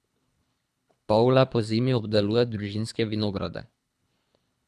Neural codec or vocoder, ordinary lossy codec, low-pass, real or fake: codec, 24 kHz, 3 kbps, HILCodec; none; none; fake